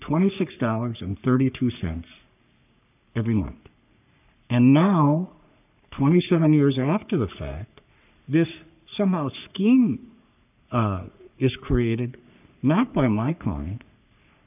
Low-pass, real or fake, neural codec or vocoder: 3.6 kHz; fake; codec, 44.1 kHz, 3.4 kbps, Pupu-Codec